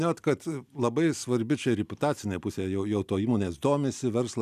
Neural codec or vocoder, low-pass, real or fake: none; 14.4 kHz; real